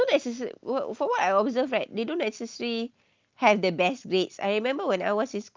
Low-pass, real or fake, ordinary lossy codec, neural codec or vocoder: 7.2 kHz; real; Opus, 32 kbps; none